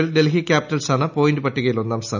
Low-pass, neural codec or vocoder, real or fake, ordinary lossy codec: 7.2 kHz; none; real; none